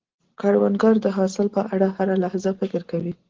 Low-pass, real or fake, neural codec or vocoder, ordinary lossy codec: 7.2 kHz; real; none; Opus, 16 kbps